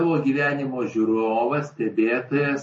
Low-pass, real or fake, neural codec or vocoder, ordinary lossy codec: 10.8 kHz; real; none; MP3, 32 kbps